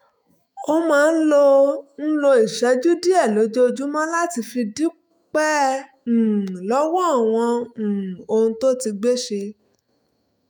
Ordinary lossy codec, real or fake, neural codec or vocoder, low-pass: none; fake; autoencoder, 48 kHz, 128 numbers a frame, DAC-VAE, trained on Japanese speech; none